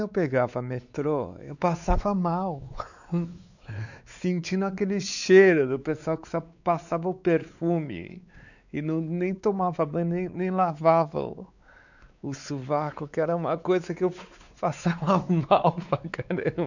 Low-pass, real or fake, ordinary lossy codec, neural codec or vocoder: 7.2 kHz; fake; none; codec, 16 kHz, 4 kbps, X-Codec, WavLM features, trained on Multilingual LibriSpeech